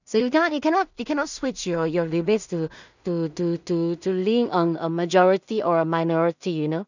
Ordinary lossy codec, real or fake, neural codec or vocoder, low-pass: none; fake; codec, 16 kHz in and 24 kHz out, 0.4 kbps, LongCat-Audio-Codec, two codebook decoder; 7.2 kHz